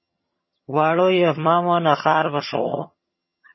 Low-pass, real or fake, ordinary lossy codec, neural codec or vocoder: 7.2 kHz; fake; MP3, 24 kbps; vocoder, 22.05 kHz, 80 mel bands, HiFi-GAN